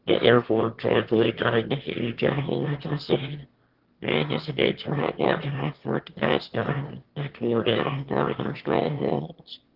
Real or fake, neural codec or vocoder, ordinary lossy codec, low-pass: fake; autoencoder, 22.05 kHz, a latent of 192 numbers a frame, VITS, trained on one speaker; Opus, 16 kbps; 5.4 kHz